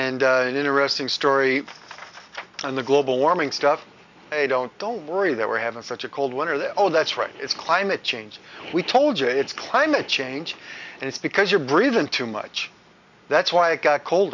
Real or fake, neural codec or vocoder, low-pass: real; none; 7.2 kHz